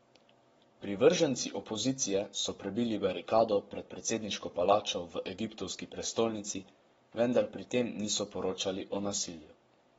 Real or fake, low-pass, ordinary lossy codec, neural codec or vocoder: fake; 19.8 kHz; AAC, 24 kbps; codec, 44.1 kHz, 7.8 kbps, Pupu-Codec